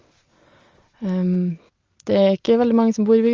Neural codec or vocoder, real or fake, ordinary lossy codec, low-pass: none; real; Opus, 32 kbps; 7.2 kHz